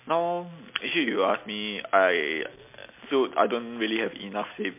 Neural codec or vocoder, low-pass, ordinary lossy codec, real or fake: none; 3.6 kHz; MP3, 32 kbps; real